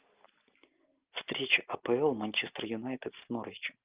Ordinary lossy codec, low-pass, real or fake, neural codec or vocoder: Opus, 24 kbps; 3.6 kHz; real; none